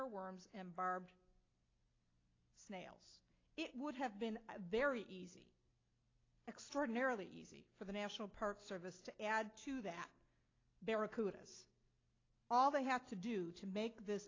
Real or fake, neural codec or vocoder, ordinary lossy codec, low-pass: real; none; AAC, 32 kbps; 7.2 kHz